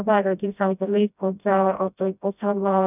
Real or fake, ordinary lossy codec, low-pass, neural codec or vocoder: fake; none; 3.6 kHz; codec, 16 kHz, 0.5 kbps, FreqCodec, smaller model